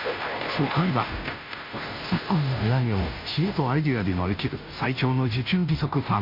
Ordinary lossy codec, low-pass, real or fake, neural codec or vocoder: MP3, 32 kbps; 5.4 kHz; fake; codec, 16 kHz, 0.5 kbps, FunCodec, trained on Chinese and English, 25 frames a second